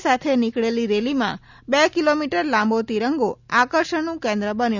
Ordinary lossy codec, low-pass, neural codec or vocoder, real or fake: none; 7.2 kHz; none; real